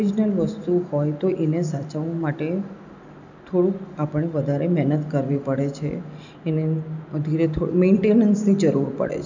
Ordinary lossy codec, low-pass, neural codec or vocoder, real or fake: none; 7.2 kHz; none; real